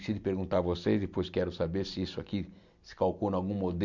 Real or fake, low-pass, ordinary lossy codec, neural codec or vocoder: real; 7.2 kHz; none; none